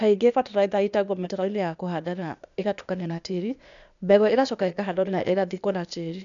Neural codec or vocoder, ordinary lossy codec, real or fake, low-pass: codec, 16 kHz, 0.8 kbps, ZipCodec; none; fake; 7.2 kHz